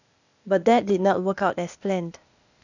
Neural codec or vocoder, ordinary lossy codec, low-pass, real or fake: codec, 16 kHz, 0.8 kbps, ZipCodec; none; 7.2 kHz; fake